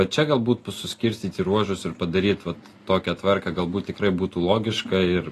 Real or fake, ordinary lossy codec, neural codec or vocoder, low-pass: real; AAC, 48 kbps; none; 14.4 kHz